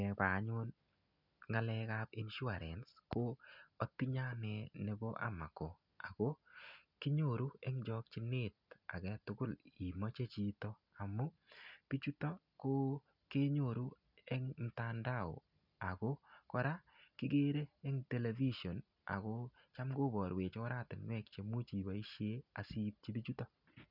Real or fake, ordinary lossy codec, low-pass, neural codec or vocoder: real; none; 5.4 kHz; none